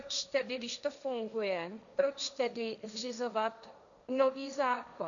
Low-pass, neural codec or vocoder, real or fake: 7.2 kHz; codec, 16 kHz, 1.1 kbps, Voila-Tokenizer; fake